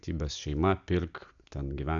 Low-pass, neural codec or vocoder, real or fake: 7.2 kHz; none; real